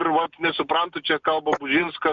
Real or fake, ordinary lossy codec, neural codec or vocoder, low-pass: real; MP3, 64 kbps; none; 7.2 kHz